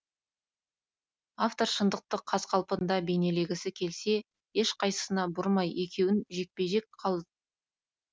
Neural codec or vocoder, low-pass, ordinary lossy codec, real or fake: none; none; none; real